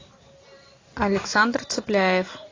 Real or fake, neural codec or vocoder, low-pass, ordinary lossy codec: real; none; 7.2 kHz; AAC, 32 kbps